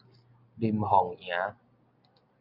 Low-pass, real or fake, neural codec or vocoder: 5.4 kHz; real; none